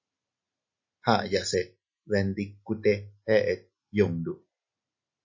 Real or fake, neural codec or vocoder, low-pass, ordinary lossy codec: real; none; 7.2 kHz; MP3, 32 kbps